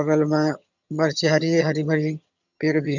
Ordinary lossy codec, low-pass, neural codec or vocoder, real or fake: none; 7.2 kHz; vocoder, 22.05 kHz, 80 mel bands, HiFi-GAN; fake